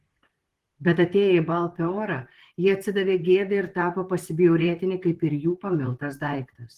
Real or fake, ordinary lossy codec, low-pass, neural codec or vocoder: fake; Opus, 16 kbps; 14.4 kHz; vocoder, 44.1 kHz, 128 mel bands, Pupu-Vocoder